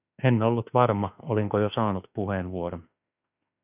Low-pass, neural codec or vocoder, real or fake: 3.6 kHz; autoencoder, 48 kHz, 32 numbers a frame, DAC-VAE, trained on Japanese speech; fake